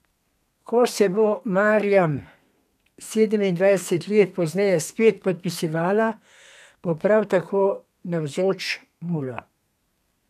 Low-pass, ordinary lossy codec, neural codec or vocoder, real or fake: 14.4 kHz; none; codec, 32 kHz, 1.9 kbps, SNAC; fake